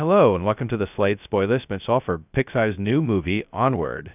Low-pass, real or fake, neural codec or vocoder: 3.6 kHz; fake; codec, 16 kHz, 0.2 kbps, FocalCodec